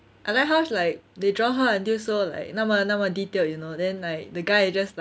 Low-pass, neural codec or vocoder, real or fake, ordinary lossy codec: none; none; real; none